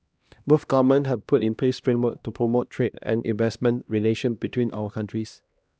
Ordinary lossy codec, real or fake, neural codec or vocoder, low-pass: none; fake; codec, 16 kHz, 1 kbps, X-Codec, HuBERT features, trained on LibriSpeech; none